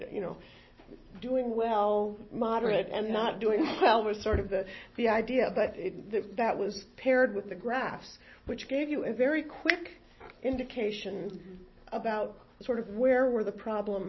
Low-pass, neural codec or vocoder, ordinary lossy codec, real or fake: 7.2 kHz; none; MP3, 24 kbps; real